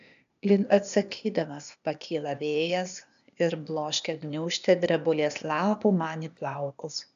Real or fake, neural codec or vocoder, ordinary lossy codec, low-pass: fake; codec, 16 kHz, 0.8 kbps, ZipCodec; AAC, 96 kbps; 7.2 kHz